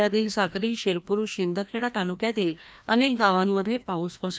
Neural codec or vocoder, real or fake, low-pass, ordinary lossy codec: codec, 16 kHz, 1 kbps, FreqCodec, larger model; fake; none; none